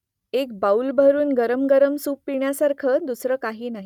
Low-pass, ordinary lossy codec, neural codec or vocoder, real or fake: 19.8 kHz; none; none; real